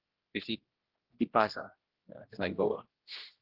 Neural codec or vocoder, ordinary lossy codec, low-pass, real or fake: codec, 16 kHz, 1 kbps, X-Codec, HuBERT features, trained on general audio; Opus, 16 kbps; 5.4 kHz; fake